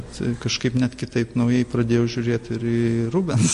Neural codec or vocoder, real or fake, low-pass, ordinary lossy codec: none; real; 14.4 kHz; MP3, 48 kbps